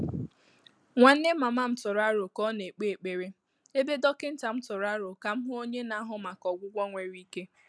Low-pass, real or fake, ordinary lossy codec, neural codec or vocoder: none; real; none; none